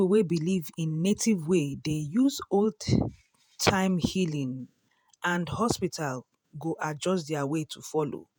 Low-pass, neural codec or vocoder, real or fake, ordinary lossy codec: none; vocoder, 48 kHz, 128 mel bands, Vocos; fake; none